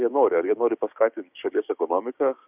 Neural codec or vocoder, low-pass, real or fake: codec, 16 kHz, 6 kbps, DAC; 3.6 kHz; fake